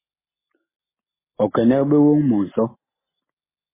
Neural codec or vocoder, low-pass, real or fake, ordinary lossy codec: none; 3.6 kHz; real; MP3, 16 kbps